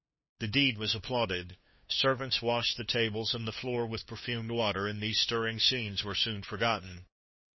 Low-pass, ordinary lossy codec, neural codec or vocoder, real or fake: 7.2 kHz; MP3, 24 kbps; codec, 16 kHz, 2 kbps, FunCodec, trained on LibriTTS, 25 frames a second; fake